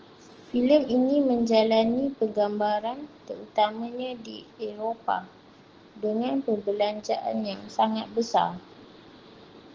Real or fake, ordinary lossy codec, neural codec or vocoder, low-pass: real; Opus, 16 kbps; none; 7.2 kHz